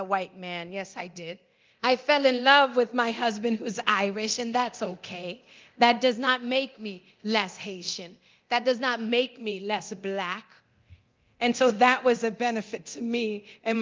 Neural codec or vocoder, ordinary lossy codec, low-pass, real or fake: codec, 24 kHz, 0.9 kbps, DualCodec; Opus, 24 kbps; 7.2 kHz; fake